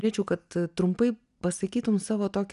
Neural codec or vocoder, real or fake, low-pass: none; real; 10.8 kHz